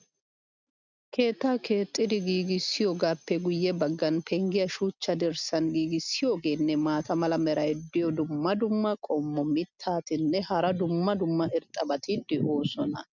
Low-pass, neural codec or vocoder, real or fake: 7.2 kHz; none; real